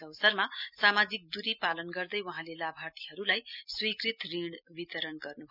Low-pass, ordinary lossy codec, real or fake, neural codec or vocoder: 5.4 kHz; none; real; none